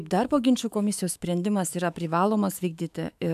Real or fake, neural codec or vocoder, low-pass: fake; autoencoder, 48 kHz, 128 numbers a frame, DAC-VAE, trained on Japanese speech; 14.4 kHz